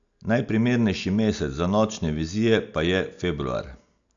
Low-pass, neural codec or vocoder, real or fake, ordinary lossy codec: 7.2 kHz; none; real; AAC, 64 kbps